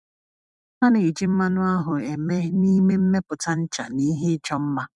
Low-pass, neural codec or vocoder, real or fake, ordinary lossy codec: 10.8 kHz; vocoder, 44.1 kHz, 128 mel bands every 256 samples, BigVGAN v2; fake; MP3, 96 kbps